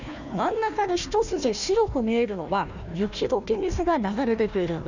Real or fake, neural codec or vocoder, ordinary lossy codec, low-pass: fake; codec, 16 kHz, 1 kbps, FunCodec, trained on Chinese and English, 50 frames a second; none; 7.2 kHz